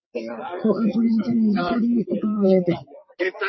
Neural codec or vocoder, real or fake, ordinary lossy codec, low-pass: vocoder, 22.05 kHz, 80 mel bands, WaveNeXt; fake; MP3, 24 kbps; 7.2 kHz